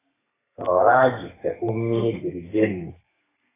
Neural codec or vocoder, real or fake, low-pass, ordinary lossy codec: codec, 32 kHz, 1.9 kbps, SNAC; fake; 3.6 kHz; AAC, 16 kbps